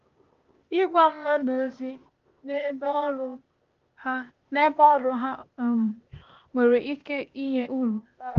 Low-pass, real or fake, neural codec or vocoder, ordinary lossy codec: 7.2 kHz; fake; codec, 16 kHz, 0.8 kbps, ZipCodec; Opus, 24 kbps